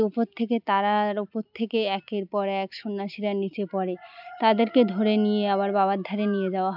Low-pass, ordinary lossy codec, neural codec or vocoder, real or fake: 5.4 kHz; none; none; real